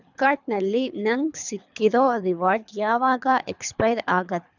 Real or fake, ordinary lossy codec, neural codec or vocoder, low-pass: fake; none; codec, 24 kHz, 3 kbps, HILCodec; 7.2 kHz